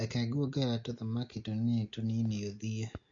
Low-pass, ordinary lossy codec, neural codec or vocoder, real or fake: 7.2 kHz; MP3, 48 kbps; none; real